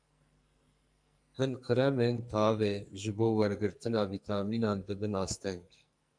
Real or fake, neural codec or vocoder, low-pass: fake; codec, 44.1 kHz, 2.6 kbps, SNAC; 9.9 kHz